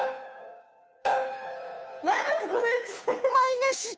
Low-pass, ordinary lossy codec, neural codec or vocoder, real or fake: none; none; codec, 16 kHz, 2 kbps, FunCodec, trained on Chinese and English, 25 frames a second; fake